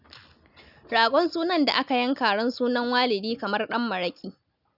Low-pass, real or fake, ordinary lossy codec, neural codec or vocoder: 5.4 kHz; real; none; none